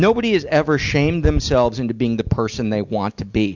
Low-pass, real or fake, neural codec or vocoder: 7.2 kHz; real; none